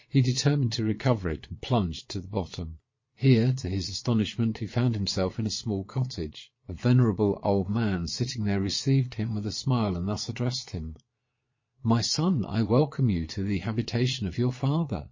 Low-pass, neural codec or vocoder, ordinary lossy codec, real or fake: 7.2 kHz; vocoder, 22.05 kHz, 80 mel bands, Vocos; MP3, 32 kbps; fake